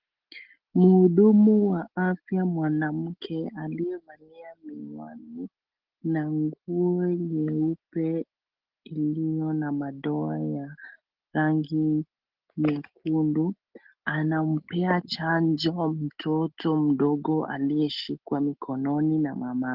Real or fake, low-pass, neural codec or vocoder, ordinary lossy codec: real; 5.4 kHz; none; Opus, 16 kbps